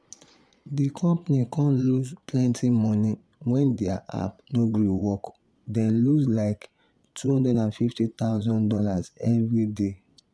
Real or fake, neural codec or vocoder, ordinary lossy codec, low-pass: fake; vocoder, 22.05 kHz, 80 mel bands, Vocos; none; none